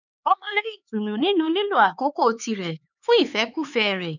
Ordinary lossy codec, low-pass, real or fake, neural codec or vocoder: none; 7.2 kHz; fake; codec, 16 kHz, 4 kbps, X-Codec, HuBERT features, trained on LibriSpeech